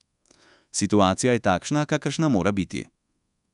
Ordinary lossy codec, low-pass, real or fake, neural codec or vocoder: none; 10.8 kHz; fake; codec, 24 kHz, 1.2 kbps, DualCodec